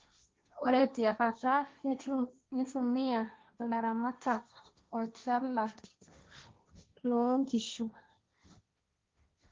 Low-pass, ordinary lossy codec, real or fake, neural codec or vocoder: 7.2 kHz; Opus, 32 kbps; fake; codec, 16 kHz, 1.1 kbps, Voila-Tokenizer